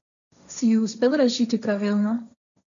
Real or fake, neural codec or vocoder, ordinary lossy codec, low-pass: fake; codec, 16 kHz, 1.1 kbps, Voila-Tokenizer; none; 7.2 kHz